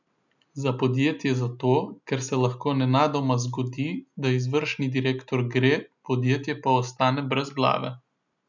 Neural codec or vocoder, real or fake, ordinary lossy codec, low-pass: none; real; none; 7.2 kHz